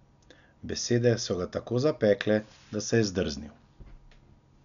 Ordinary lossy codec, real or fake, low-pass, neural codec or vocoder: none; real; 7.2 kHz; none